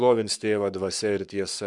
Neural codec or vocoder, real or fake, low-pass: codec, 44.1 kHz, 7.8 kbps, Pupu-Codec; fake; 10.8 kHz